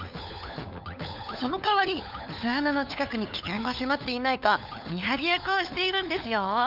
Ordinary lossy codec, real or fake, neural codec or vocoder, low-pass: none; fake; codec, 16 kHz, 4 kbps, FunCodec, trained on LibriTTS, 50 frames a second; 5.4 kHz